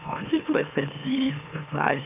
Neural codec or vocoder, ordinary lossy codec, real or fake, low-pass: autoencoder, 44.1 kHz, a latent of 192 numbers a frame, MeloTTS; none; fake; 3.6 kHz